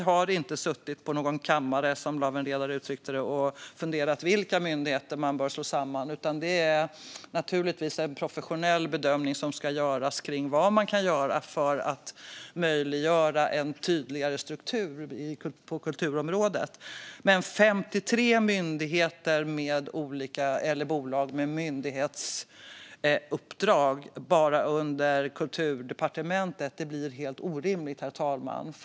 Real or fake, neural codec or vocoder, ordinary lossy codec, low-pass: real; none; none; none